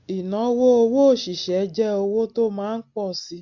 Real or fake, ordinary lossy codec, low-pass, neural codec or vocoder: real; MP3, 48 kbps; 7.2 kHz; none